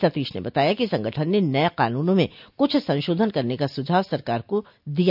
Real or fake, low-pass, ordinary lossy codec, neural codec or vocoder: real; 5.4 kHz; none; none